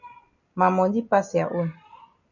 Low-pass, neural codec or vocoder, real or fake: 7.2 kHz; none; real